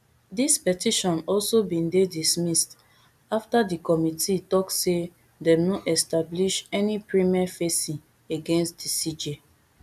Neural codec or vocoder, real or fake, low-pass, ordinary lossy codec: none; real; 14.4 kHz; none